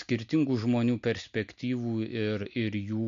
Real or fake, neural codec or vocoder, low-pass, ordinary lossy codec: real; none; 7.2 kHz; MP3, 48 kbps